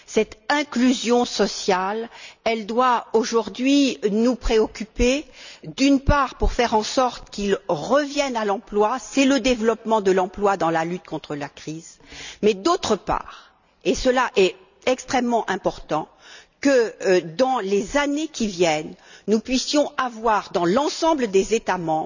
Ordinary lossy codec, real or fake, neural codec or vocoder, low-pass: none; real; none; 7.2 kHz